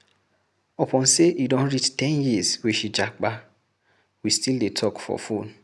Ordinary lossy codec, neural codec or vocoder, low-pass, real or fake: none; none; none; real